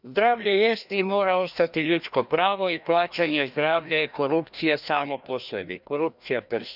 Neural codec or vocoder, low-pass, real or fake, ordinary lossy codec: codec, 16 kHz, 1 kbps, FreqCodec, larger model; 5.4 kHz; fake; none